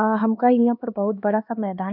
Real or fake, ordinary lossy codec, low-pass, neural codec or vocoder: fake; none; 5.4 kHz; codec, 16 kHz, 2 kbps, X-Codec, HuBERT features, trained on LibriSpeech